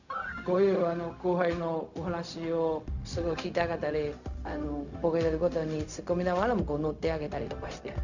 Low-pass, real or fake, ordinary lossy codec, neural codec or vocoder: 7.2 kHz; fake; none; codec, 16 kHz, 0.4 kbps, LongCat-Audio-Codec